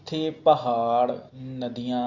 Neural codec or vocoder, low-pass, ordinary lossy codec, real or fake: none; 7.2 kHz; Opus, 64 kbps; real